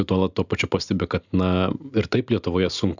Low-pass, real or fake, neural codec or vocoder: 7.2 kHz; real; none